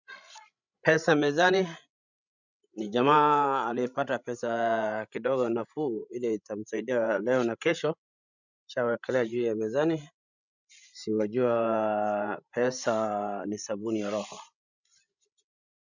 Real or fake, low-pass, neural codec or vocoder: fake; 7.2 kHz; codec, 16 kHz, 16 kbps, FreqCodec, larger model